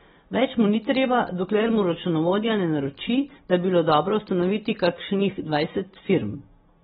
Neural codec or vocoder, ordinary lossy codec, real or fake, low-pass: none; AAC, 16 kbps; real; 10.8 kHz